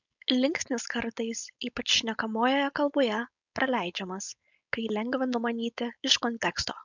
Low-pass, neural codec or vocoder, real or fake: 7.2 kHz; codec, 16 kHz, 4.8 kbps, FACodec; fake